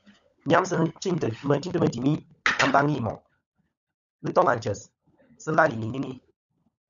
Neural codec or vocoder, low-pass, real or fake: codec, 16 kHz, 16 kbps, FunCodec, trained on LibriTTS, 50 frames a second; 7.2 kHz; fake